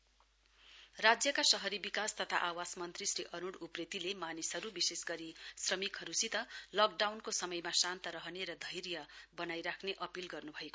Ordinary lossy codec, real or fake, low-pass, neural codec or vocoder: none; real; none; none